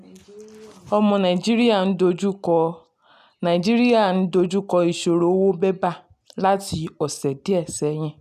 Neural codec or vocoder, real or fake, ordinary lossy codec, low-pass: none; real; none; 14.4 kHz